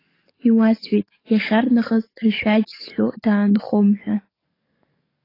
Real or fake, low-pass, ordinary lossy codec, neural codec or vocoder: fake; 5.4 kHz; AAC, 24 kbps; codec, 44.1 kHz, 7.8 kbps, DAC